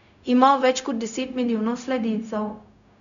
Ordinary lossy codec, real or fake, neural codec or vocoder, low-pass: none; fake; codec, 16 kHz, 0.4 kbps, LongCat-Audio-Codec; 7.2 kHz